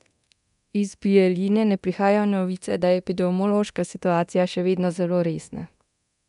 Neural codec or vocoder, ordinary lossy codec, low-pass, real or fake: codec, 24 kHz, 0.9 kbps, DualCodec; none; 10.8 kHz; fake